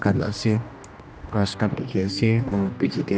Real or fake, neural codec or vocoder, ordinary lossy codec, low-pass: fake; codec, 16 kHz, 1 kbps, X-Codec, HuBERT features, trained on general audio; none; none